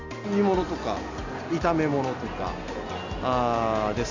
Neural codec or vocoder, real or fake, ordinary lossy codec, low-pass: none; real; Opus, 64 kbps; 7.2 kHz